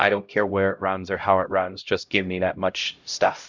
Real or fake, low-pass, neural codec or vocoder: fake; 7.2 kHz; codec, 16 kHz, 0.5 kbps, X-Codec, HuBERT features, trained on LibriSpeech